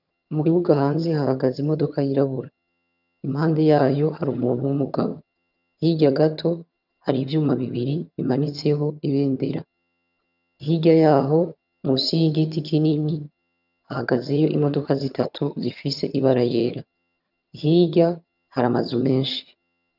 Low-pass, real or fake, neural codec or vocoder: 5.4 kHz; fake; vocoder, 22.05 kHz, 80 mel bands, HiFi-GAN